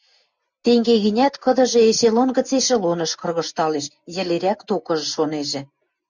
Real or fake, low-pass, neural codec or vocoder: real; 7.2 kHz; none